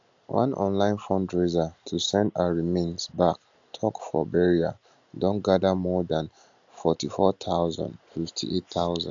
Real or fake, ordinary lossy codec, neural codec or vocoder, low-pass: real; AAC, 64 kbps; none; 7.2 kHz